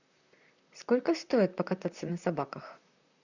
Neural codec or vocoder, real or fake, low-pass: vocoder, 44.1 kHz, 128 mel bands, Pupu-Vocoder; fake; 7.2 kHz